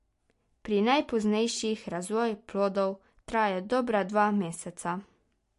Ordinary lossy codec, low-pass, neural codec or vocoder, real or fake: MP3, 48 kbps; 14.4 kHz; none; real